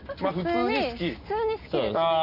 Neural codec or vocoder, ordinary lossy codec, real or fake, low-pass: none; none; real; 5.4 kHz